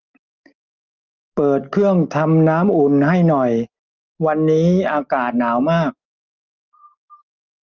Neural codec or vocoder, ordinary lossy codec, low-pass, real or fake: none; Opus, 32 kbps; 7.2 kHz; real